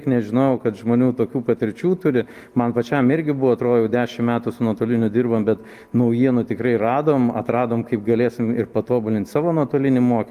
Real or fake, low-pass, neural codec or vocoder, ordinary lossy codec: real; 14.4 kHz; none; Opus, 24 kbps